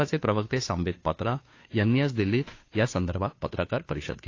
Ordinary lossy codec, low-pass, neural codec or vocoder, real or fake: AAC, 32 kbps; 7.2 kHz; codec, 16 kHz, 2 kbps, FunCodec, trained on Chinese and English, 25 frames a second; fake